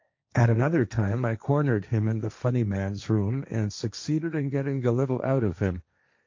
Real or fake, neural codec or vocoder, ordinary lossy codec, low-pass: fake; codec, 16 kHz, 1.1 kbps, Voila-Tokenizer; MP3, 48 kbps; 7.2 kHz